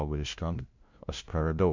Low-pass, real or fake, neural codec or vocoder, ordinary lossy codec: 7.2 kHz; fake; codec, 16 kHz, 0.5 kbps, FunCodec, trained on LibriTTS, 25 frames a second; MP3, 64 kbps